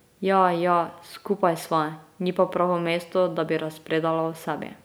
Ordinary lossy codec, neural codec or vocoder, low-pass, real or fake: none; none; none; real